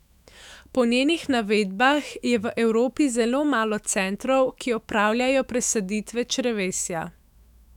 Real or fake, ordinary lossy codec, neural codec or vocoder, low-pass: fake; none; autoencoder, 48 kHz, 128 numbers a frame, DAC-VAE, trained on Japanese speech; 19.8 kHz